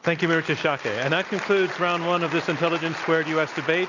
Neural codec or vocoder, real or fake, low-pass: none; real; 7.2 kHz